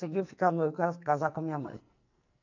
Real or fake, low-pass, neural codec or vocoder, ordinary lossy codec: fake; 7.2 kHz; codec, 44.1 kHz, 2.6 kbps, SNAC; none